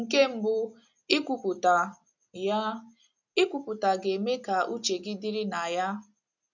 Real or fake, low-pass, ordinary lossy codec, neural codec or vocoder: real; 7.2 kHz; none; none